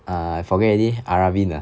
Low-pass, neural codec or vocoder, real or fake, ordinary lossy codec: none; none; real; none